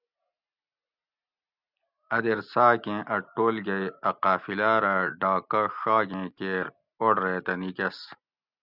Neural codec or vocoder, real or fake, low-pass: none; real; 5.4 kHz